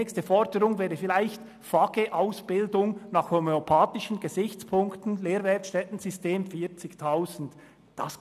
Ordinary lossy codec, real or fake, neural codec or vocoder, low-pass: none; real; none; 14.4 kHz